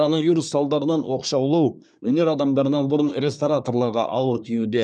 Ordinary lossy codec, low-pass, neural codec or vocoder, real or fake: none; 9.9 kHz; codec, 24 kHz, 1 kbps, SNAC; fake